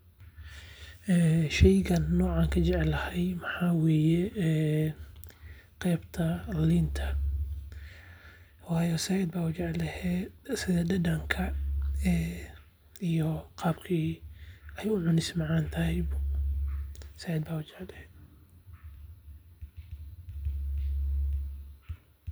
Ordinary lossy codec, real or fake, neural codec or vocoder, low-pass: none; real; none; none